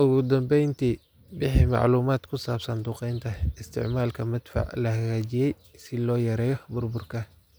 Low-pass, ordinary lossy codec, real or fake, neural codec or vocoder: none; none; real; none